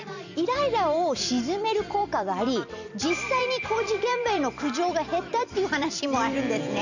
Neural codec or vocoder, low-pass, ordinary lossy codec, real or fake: vocoder, 44.1 kHz, 128 mel bands every 256 samples, BigVGAN v2; 7.2 kHz; none; fake